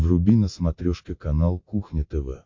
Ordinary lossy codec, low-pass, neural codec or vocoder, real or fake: MP3, 48 kbps; 7.2 kHz; none; real